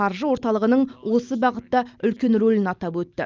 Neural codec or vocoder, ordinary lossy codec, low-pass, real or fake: none; Opus, 24 kbps; 7.2 kHz; real